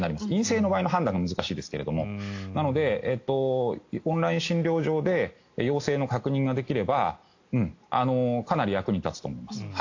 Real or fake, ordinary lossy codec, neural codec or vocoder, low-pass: real; AAC, 48 kbps; none; 7.2 kHz